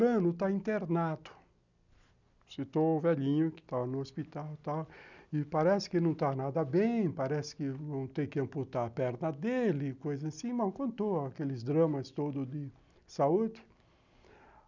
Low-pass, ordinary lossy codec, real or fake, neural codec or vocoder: 7.2 kHz; none; real; none